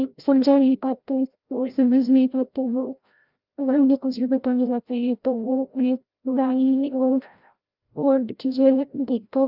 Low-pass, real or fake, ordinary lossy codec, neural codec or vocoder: 5.4 kHz; fake; Opus, 24 kbps; codec, 16 kHz, 0.5 kbps, FreqCodec, larger model